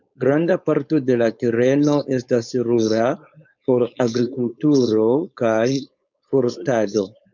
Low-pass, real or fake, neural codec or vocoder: 7.2 kHz; fake; codec, 16 kHz, 4.8 kbps, FACodec